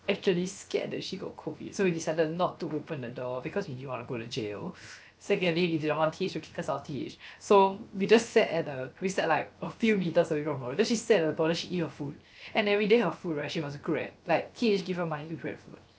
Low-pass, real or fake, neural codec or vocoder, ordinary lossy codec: none; fake; codec, 16 kHz, 0.7 kbps, FocalCodec; none